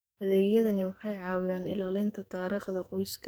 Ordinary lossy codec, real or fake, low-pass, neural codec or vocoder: none; fake; none; codec, 44.1 kHz, 2.6 kbps, SNAC